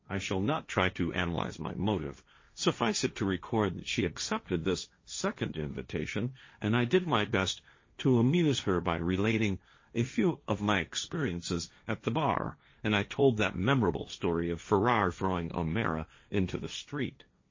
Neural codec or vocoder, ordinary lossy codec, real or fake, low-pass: codec, 16 kHz, 1.1 kbps, Voila-Tokenizer; MP3, 32 kbps; fake; 7.2 kHz